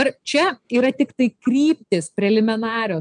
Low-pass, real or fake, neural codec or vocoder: 9.9 kHz; real; none